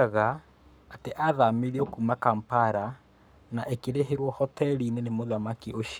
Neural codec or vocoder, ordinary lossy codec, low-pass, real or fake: codec, 44.1 kHz, 7.8 kbps, Pupu-Codec; none; none; fake